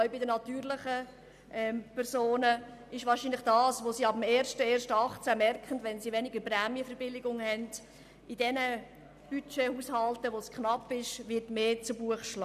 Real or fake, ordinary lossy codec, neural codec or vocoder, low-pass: real; none; none; 14.4 kHz